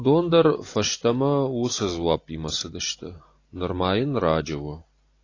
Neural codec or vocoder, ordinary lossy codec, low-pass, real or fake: none; AAC, 32 kbps; 7.2 kHz; real